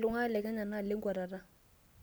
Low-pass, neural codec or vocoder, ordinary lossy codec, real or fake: none; none; none; real